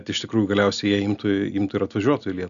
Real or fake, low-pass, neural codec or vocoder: real; 7.2 kHz; none